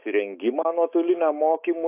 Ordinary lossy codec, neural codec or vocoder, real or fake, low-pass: AAC, 24 kbps; autoencoder, 48 kHz, 128 numbers a frame, DAC-VAE, trained on Japanese speech; fake; 3.6 kHz